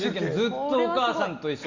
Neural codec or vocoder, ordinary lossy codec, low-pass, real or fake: none; Opus, 64 kbps; 7.2 kHz; real